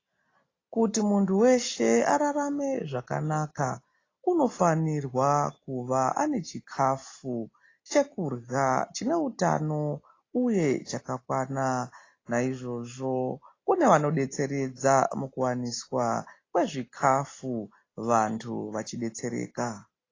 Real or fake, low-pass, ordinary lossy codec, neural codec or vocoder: real; 7.2 kHz; AAC, 32 kbps; none